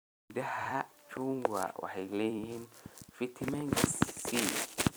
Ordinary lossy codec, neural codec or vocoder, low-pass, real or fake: none; vocoder, 44.1 kHz, 128 mel bands every 256 samples, BigVGAN v2; none; fake